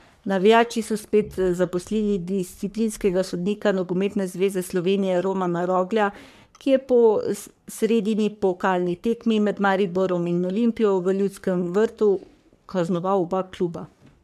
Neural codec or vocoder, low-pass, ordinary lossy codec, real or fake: codec, 44.1 kHz, 3.4 kbps, Pupu-Codec; 14.4 kHz; none; fake